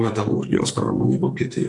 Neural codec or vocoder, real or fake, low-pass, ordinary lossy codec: codec, 44.1 kHz, 2.6 kbps, SNAC; fake; 10.8 kHz; AAC, 64 kbps